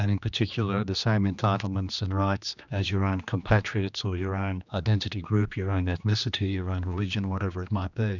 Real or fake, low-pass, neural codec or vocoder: fake; 7.2 kHz; codec, 16 kHz, 2 kbps, X-Codec, HuBERT features, trained on general audio